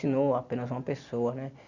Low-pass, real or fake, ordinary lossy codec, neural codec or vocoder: 7.2 kHz; real; none; none